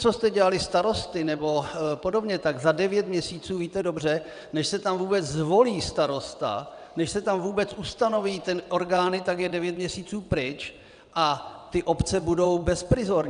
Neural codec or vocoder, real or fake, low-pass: vocoder, 22.05 kHz, 80 mel bands, WaveNeXt; fake; 9.9 kHz